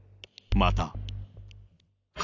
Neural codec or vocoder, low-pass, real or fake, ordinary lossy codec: none; 7.2 kHz; real; none